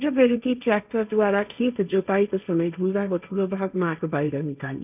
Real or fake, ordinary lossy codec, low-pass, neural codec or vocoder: fake; none; 3.6 kHz; codec, 16 kHz, 1.1 kbps, Voila-Tokenizer